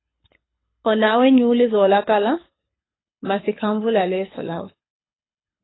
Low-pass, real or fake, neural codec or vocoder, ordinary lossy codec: 7.2 kHz; fake; codec, 24 kHz, 6 kbps, HILCodec; AAC, 16 kbps